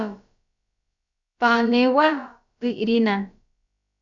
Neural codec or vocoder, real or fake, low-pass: codec, 16 kHz, about 1 kbps, DyCAST, with the encoder's durations; fake; 7.2 kHz